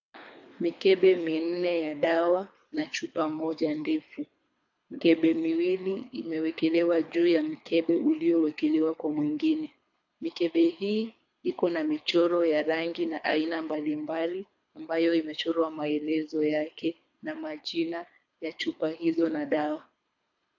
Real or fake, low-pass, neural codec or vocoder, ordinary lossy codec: fake; 7.2 kHz; codec, 24 kHz, 3 kbps, HILCodec; AAC, 48 kbps